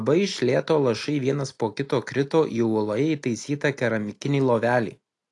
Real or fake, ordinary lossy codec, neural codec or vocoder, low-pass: real; AAC, 48 kbps; none; 10.8 kHz